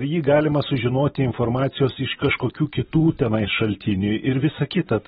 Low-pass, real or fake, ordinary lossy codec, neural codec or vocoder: 19.8 kHz; real; AAC, 16 kbps; none